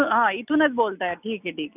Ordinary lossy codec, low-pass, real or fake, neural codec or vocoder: none; 3.6 kHz; real; none